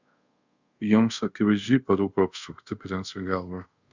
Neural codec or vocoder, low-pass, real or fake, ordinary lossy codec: codec, 24 kHz, 0.5 kbps, DualCodec; 7.2 kHz; fake; Opus, 64 kbps